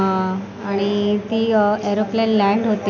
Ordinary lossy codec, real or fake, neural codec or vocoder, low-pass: none; real; none; none